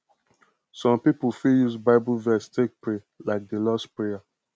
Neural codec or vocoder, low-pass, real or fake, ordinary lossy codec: none; none; real; none